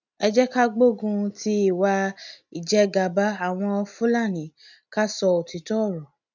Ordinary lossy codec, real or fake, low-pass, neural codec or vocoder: none; real; 7.2 kHz; none